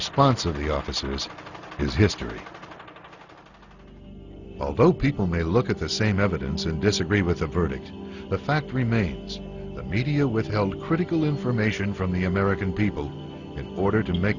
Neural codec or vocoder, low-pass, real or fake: none; 7.2 kHz; real